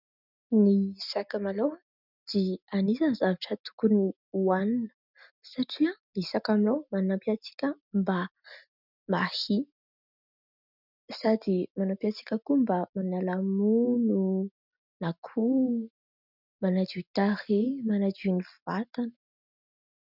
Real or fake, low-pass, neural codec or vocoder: real; 5.4 kHz; none